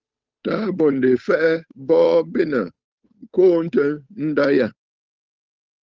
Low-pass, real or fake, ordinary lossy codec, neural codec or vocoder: 7.2 kHz; fake; Opus, 24 kbps; codec, 16 kHz, 8 kbps, FunCodec, trained on Chinese and English, 25 frames a second